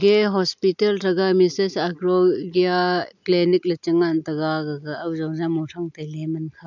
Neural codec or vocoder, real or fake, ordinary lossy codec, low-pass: none; real; none; 7.2 kHz